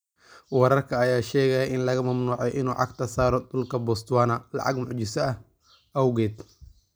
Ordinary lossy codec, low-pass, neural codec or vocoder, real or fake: none; none; none; real